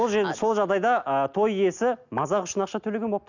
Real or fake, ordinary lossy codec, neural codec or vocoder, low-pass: real; none; none; 7.2 kHz